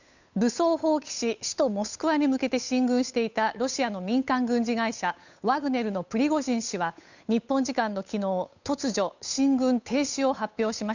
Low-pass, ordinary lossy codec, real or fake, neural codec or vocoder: 7.2 kHz; none; fake; codec, 16 kHz, 8 kbps, FunCodec, trained on Chinese and English, 25 frames a second